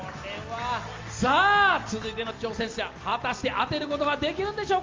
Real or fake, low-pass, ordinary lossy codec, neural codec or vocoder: real; 7.2 kHz; Opus, 32 kbps; none